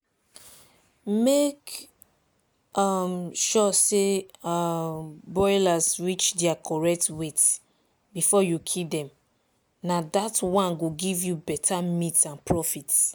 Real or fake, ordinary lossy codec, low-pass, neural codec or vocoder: real; none; none; none